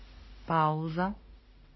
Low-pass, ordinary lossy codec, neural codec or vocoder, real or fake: 7.2 kHz; MP3, 24 kbps; autoencoder, 48 kHz, 32 numbers a frame, DAC-VAE, trained on Japanese speech; fake